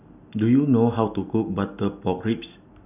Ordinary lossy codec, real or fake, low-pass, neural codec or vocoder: none; real; 3.6 kHz; none